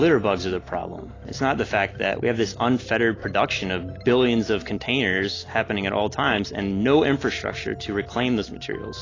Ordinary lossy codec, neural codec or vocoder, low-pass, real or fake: AAC, 32 kbps; none; 7.2 kHz; real